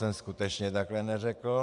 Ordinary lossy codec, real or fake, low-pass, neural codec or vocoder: MP3, 96 kbps; fake; 10.8 kHz; vocoder, 44.1 kHz, 128 mel bands every 512 samples, BigVGAN v2